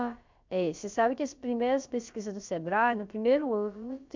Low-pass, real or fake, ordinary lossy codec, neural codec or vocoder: 7.2 kHz; fake; MP3, 64 kbps; codec, 16 kHz, about 1 kbps, DyCAST, with the encoder's durations